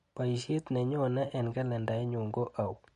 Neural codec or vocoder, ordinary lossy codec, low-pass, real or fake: none; MP3, 48 kbps; 14.4 kHz; real